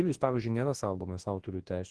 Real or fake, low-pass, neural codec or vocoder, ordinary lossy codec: fake; 10.8 kHz; codec, 24 kHz, 0.9 kbps, WavTokenizer, large speech release; Opus, 16 kbps